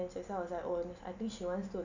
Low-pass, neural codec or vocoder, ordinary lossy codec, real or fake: 7.2 kHz; none; none; real